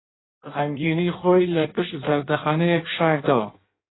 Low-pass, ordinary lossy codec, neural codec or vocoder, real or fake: 7.2 kHz; AAC, 16 kbps; codec, 16 kHz in and 24 kHz out, 0.6 kbps, FireRedTTS-2 codec; fake